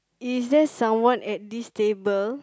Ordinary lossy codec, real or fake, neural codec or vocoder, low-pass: none; real; none; none